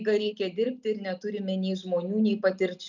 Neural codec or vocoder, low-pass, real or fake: none; 7.2 kHz; real